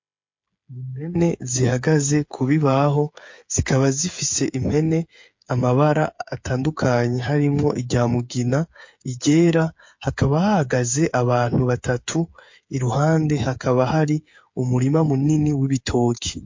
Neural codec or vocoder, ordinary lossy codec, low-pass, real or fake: codec, 16 kHz, 8 kbps, FreqCodec, smaller model; MP3, 48 kbps; 7.2 kHz; fake